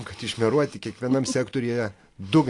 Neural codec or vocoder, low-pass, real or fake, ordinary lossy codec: none; 10.8 kHz; real; AAC, 48 kbps